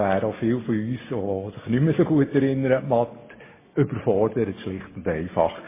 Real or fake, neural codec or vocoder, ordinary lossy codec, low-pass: real; none; MP3, 16 kbps; 3.6 kHz